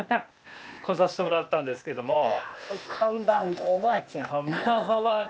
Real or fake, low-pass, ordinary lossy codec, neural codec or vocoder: fake; none; none; codec, 16 kHz, 0.8 kbps, ZipCodec